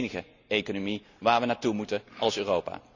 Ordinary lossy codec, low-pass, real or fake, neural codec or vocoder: Opus, 64 kbps; 7.2 kHz; real; none